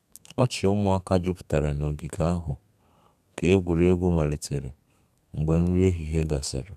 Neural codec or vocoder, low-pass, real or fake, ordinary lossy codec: codec, 32 kHz, 1.9 kbps, SNAC; 14.4 kHz; fake; none